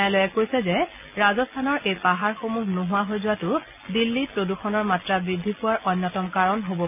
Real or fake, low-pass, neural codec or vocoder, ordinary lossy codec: real; 3.6 kHz; none; none